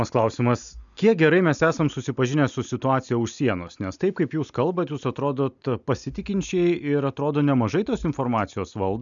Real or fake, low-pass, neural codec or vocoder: real; 7.2 kHz; none